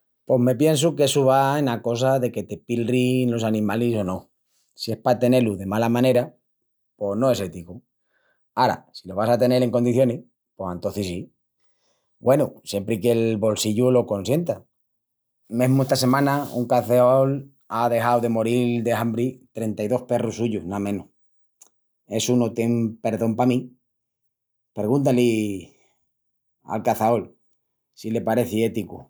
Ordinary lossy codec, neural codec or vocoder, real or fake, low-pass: none; none; real; none